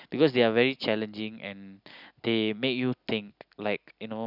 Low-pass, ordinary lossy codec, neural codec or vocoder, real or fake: 5.4 kHz; none; none; real